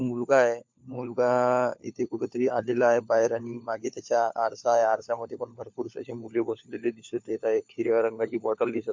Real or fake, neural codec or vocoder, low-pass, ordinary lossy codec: fake; codec, 16 kHz, 4 kbps, FunCodec, trained on LibriTTS, 50 frames a second; 7.2 kHz; MP3, 48 kbps